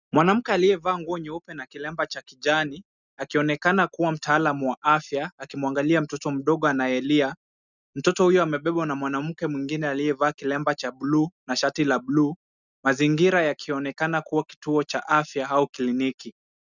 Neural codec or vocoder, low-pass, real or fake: none; 7.2 kHz; real